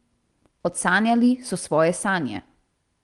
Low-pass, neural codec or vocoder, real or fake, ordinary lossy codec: 10.8 kHz; none; real; Opus, 24 kbps